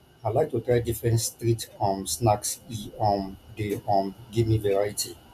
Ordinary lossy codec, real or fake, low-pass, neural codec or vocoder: none; real; 14.4 kHz; none